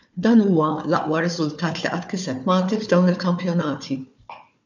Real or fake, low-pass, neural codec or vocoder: fake; 7.2 kHz; codec, 16 kHz, 4 kbps, FunCodec, trained on Chinese and English, 50 frames a second